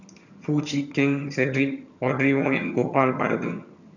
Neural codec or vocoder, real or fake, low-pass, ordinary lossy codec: vocoder, 22.05 kHz, 80 mel bands, HiFi-GAN; fake; 7.2 kHz; none